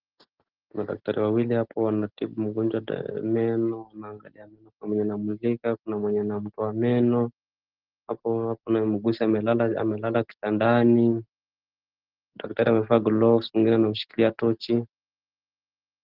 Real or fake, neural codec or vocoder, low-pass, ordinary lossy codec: real; none; 5.4 kHz; Opus, 16 kbps